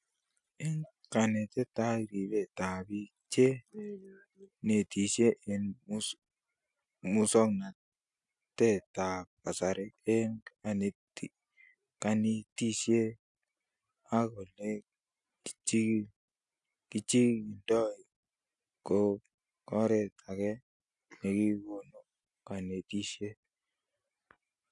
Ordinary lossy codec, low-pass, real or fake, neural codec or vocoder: none; 10.8 kHz; real; none